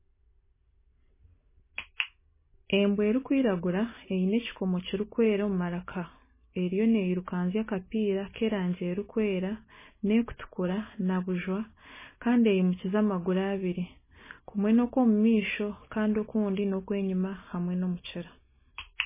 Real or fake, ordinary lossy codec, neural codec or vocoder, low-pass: real; MP3, 16 kbps; none; 3.6 kHz